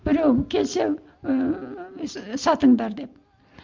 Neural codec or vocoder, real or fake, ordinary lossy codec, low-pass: none; real; Opus, 24 kbps; 7.2 kHz